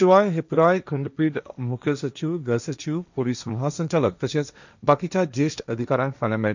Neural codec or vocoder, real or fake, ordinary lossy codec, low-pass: codec, 16 kHz, 1.1 kbps, Voila-Tokenizer; fake; none; 7.2 kHz